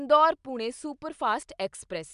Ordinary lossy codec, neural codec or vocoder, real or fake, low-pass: MP3, 96 kbps; none; real; 10.8 kHz